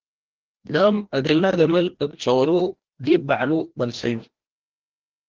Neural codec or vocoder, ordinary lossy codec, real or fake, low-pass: codec, 16 kHz, 1 kbps, FreqCodec, larger model; Opus, 16 kbps; fake; 7.2 kHz